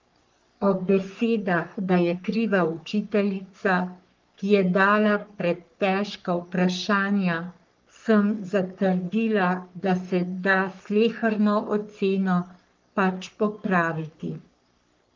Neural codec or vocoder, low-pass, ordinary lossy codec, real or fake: codec, 44.1 kHz, 3.4 kbps, Pupu-Codec; 7.2 kHz; Opus, 32 kbps; fake